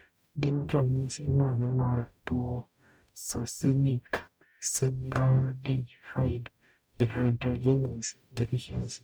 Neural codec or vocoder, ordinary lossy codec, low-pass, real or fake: codec, 44.1 kHz, 0.9 kbps, DAC; none; none; fake